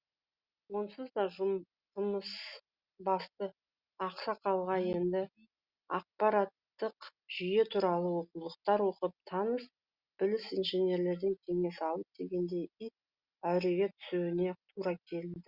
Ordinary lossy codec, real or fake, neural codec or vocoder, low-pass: Opus, 64 kbps; real; none; 5.4 kHz